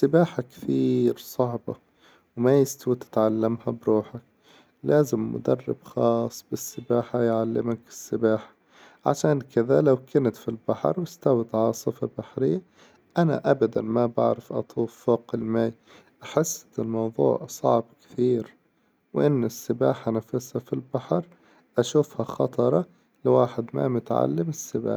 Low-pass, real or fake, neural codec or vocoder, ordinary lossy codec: none; real; none; none